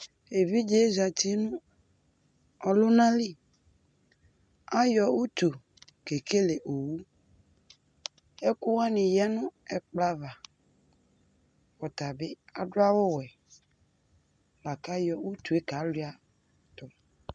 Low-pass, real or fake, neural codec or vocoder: 9.9 kHz; real; none